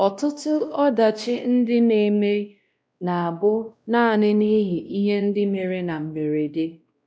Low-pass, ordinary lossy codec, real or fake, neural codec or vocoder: none; none; fake; codec, 16 kHz, 1 kbps, X-Codec, WavLM features, trained on Multilingual LibriSpeech